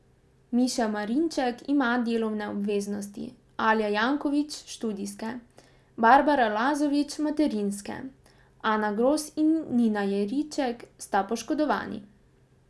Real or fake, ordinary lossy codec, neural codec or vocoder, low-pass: real; none; none; none